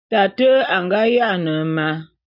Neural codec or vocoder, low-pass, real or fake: none; 5.4 kHz; real